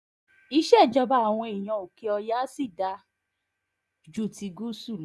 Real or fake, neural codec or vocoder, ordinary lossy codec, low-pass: fake; vocoder, 24 kHz, 100 mel bands, Vocos; none; none